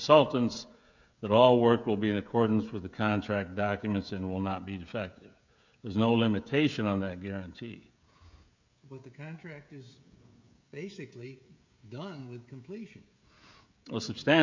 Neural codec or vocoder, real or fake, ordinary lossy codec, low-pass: codec, 16 kHz, 16 kbps, FreqCodec, smaller model; fake; MP3, 64 kbps; 7.2 kHz